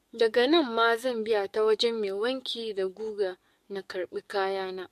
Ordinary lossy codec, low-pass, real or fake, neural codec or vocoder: MP3, 64 kbps; 14.4 kHz; fake; codec, 44.1 kHz, 7.8 kbps, DAC